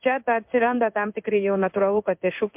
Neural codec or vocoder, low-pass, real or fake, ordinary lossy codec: codec, 16 kHz in and 24 kHz out, 1 kbps, XY-Tokenizer; 3.6 kHz; fake; MP3, 32 kbps